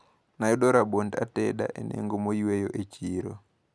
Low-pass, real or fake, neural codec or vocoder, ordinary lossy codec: none; real; none; none